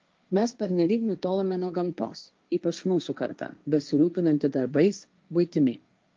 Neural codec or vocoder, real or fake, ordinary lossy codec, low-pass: codec, 16 kHz, 1.1 kbps, Voila-Tokenizer; fake; Opus, 24 kbps; 7.2 kHz